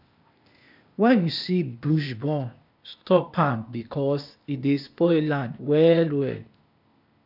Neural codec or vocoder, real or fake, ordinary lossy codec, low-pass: codec, 16 kHz, 0.8 kbps, ZipCodec; fake; none; 5.4 kHz